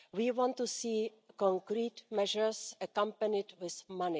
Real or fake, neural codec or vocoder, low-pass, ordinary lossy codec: real; none; none; none